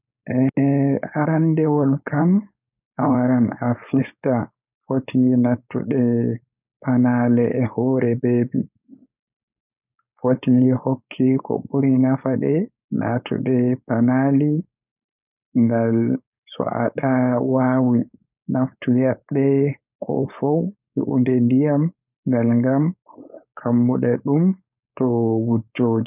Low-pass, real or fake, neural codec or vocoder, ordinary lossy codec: 3.6 kHz; fake; codec, 16 kHz, 4.8 kbps, FACodec; none